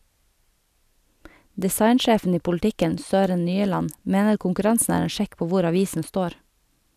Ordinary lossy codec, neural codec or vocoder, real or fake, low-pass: none; none; real; 14.4 kHz